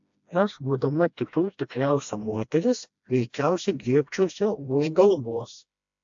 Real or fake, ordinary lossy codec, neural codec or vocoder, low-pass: fake; AAC, 64 kbps; codec, 16 kHz, 1 kbps, FreqCodec, smaller model; 7.2 kHz